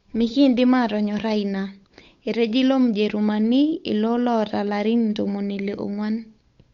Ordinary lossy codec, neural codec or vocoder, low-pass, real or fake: Opus, 64 kbps; codec, 16 kHz, 8 kbps, FunCodec, trained on Chinese and English, 25 frames a second; 7.2 kHz; fake